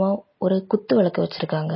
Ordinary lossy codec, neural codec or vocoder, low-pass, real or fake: MP3, 24 kbps; none; 7.2 kHz; real